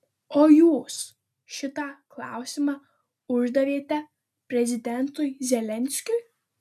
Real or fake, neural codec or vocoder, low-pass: real; none; 14.4 kHz